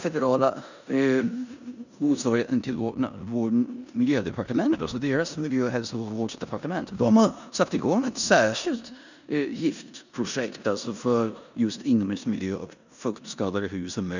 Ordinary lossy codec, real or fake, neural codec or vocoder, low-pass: none; fake; codec, 16 kHz in and 24 kHz out, 0.9 kbps, LongCat-Audio-Codec, four codebook decoder; 7.2 kHz